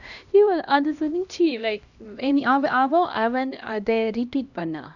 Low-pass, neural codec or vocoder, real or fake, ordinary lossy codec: 7.2 kHz; codec, 16 kHz, 1 kbps, X-Codec, HuBERT features, trained on LibriSpeech; fake; none